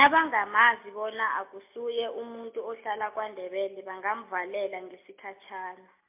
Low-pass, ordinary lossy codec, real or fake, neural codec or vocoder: 3.6 kHz; MP3, 32 kbps; real; none